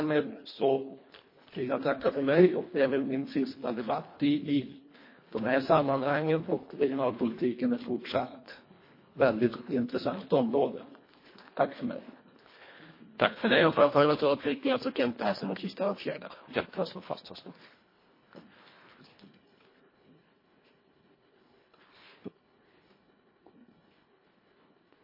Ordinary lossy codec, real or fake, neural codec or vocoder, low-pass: MP3, 24 kbps; fake; codec, 24 kHz, 1.5 kbps, HILCodec; 5.4 kHz